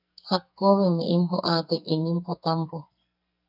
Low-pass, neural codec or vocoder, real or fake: 5.4 kHz; codec, 44.1 kHz, 2.6 kbps, SNAC; fake